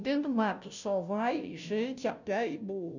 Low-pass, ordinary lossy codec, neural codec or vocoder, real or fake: 7.2 kHz; none; codec, 16 kHz, 0.5 kbps, FunCodec, trained on Chinese and English, 25 frames a second; fake